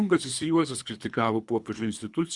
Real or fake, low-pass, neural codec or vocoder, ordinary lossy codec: fake; 10.8 kHz; codec, 24 kHz, 3 kbps, HILCodec; Opus, 64 kbps